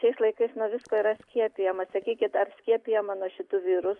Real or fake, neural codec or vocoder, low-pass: real; none; 10.8 kHz